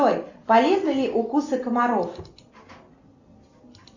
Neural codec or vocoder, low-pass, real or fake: none; 7.2 kHz; real